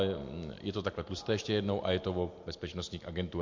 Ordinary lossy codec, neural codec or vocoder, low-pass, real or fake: MP3, 48 kbps; none; 7.2 kHz; real